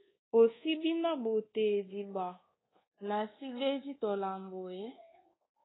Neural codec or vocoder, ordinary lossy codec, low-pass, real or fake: codec, 24 kHz, 1.2 kbps, DualCodec; AAC, 16 kbps; 7.2 kHz; fake